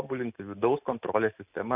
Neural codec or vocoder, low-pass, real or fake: none; 3.6 kHz; real